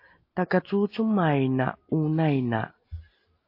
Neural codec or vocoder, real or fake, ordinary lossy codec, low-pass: none; real; MP3, 32 kbps; 5.4 kHz